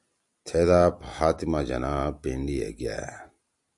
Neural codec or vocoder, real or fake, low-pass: none; real; 10.8 kHz